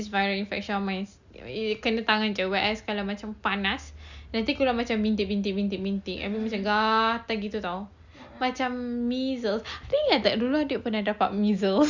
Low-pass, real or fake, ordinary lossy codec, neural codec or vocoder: 7.2 kHz; real; Opus, 64 kbps; none